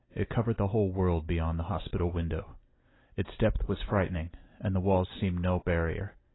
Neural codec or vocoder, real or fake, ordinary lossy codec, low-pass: none; real; AAC, 16 kbps; 7.2 kHz